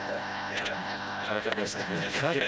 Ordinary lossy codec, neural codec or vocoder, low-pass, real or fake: none; codec, 16 kHz, 0.5 kbps, FreqCodec, smaller model; none; fake